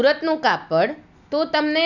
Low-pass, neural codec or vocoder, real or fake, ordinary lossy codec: 7.2 kHz; none; real; none